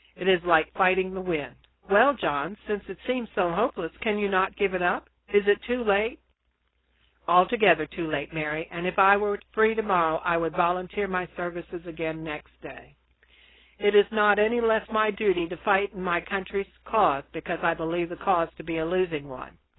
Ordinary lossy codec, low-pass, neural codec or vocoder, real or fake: AAC, 16 kbps; 7.2 kHz; codec, 16 kHz, 4.8 kbps, FACodec; fake